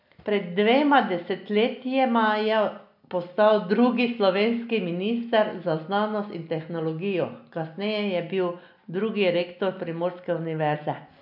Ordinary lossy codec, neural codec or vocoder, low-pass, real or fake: none; none; 5.4 kHz; real